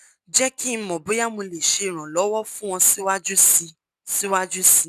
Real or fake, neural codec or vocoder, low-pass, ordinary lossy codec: real; none; 14.4 kHz; none